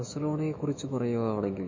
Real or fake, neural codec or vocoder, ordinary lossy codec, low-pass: fake; autoencoder, 48 kHz, 128 numbers a frame, DAC-VAE, trained on Japanese speech; MP3, 32 kbps; 7.2 kHz